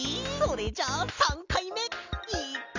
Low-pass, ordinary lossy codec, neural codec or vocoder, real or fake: 7.2 kHz; none; none; real